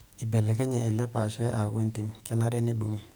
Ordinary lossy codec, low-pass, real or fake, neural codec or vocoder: none; none; fake; codec, 44.1 kHz, 2.6 kbps, SNAC